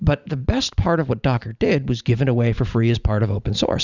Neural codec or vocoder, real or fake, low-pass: vocoder, 44.1 kHz, 128 mel bands every 512 samples, BigVGAN v2; fake; 7.2 kHz